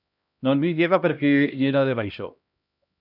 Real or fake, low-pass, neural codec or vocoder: fake; 5.4 kHz; codec, 16 kHz, 1 kbps, X-Codec, HuBERT features, trained on LibriSpeech